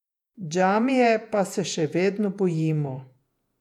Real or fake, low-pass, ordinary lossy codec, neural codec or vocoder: fake; 19.8 kHz; none; vocoder, 48 kHz, 128 mel bands, Vocos